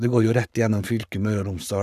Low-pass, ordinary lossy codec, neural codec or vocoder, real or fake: 14.4 kHz; none; codec, 44.1 kHz, 7.8 kbps, Pupu-Codec; fake